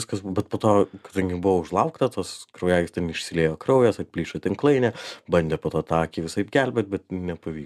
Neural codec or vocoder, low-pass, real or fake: none; 14.4 kHz; real